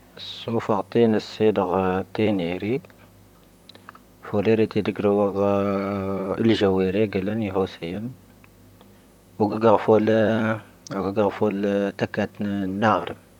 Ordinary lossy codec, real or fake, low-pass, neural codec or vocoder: none; fake; 19.8 kHz; vocoder, 44.1 kHz, 128 mel bands, Pupu-Vocoder